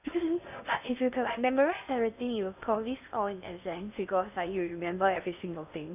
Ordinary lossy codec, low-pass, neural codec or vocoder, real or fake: none; 3.6 kHz; codec, 16 kHz in and 24 kHz out, 0.6 kbps, FocalCodec, streaming, 2048 codes; fake